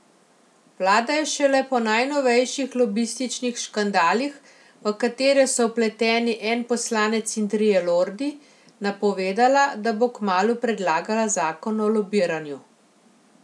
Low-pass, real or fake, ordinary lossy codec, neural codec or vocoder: none; real; none; none